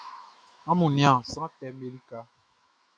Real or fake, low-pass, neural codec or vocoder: fake; 9.9 kHz; autoencoder, 48 kHz, 128 numbers a frame, DAC-VAE, trained on Japanese speech